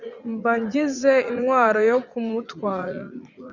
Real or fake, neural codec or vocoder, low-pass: fake; vocoder, 44.1 kHz, 80 mel bands, Vocos; 7.2 kHz